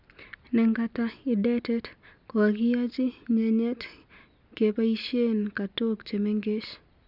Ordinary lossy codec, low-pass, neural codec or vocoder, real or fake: Opus, 64 kbps; 5.4 kHz; none; real